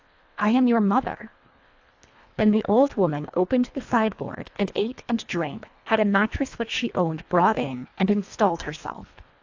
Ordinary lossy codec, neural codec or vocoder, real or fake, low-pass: AAC, 48 kbps; codec, 24 kHz, 1.5 kbps, HILCodec; fake; 7.2 kHz